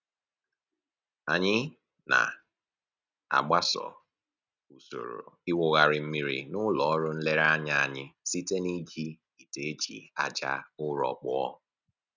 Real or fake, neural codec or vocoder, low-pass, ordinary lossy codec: real; none; 7.2 kHz; none